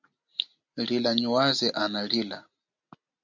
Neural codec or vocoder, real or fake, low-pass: none; real; 7.2 kHz